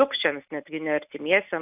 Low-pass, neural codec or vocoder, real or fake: 3.6 kHz; none; real